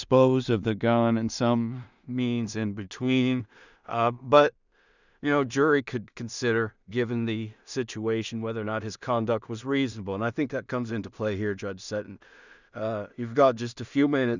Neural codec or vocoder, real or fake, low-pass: codec, 16 kHz in and 24 kHz out, 0.4 kbps, LongCat-Audio-Codec, two codebook decoder; fake; 7.2 kHz